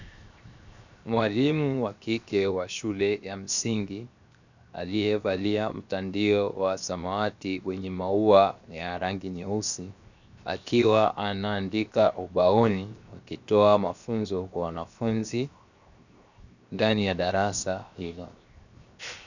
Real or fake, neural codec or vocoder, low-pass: fake; codec, 16 kHz, 0.7 kbps, FocalCodec; 7.2 kHz